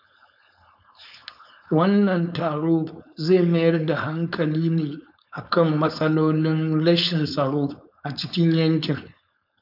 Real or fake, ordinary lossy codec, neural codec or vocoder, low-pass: fake; none; codec, 16 kHz, 4.8 kbps, FACodec; 5.4 kHz